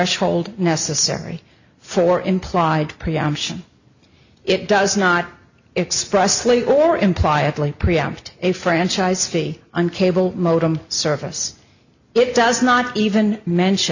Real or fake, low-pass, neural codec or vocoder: real; 7.2 kHz; none